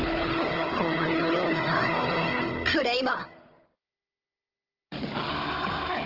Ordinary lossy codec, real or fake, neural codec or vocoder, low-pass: Opus, 16 kbps; fake; codec, 16 kHz, 16 kbps, FunCodec, trained on Chinese and English, 50 frames a second; 5.4 kHz